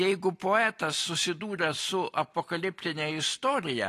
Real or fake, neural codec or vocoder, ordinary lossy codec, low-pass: real; none; AAC, 48 kbps; 14.4 kHz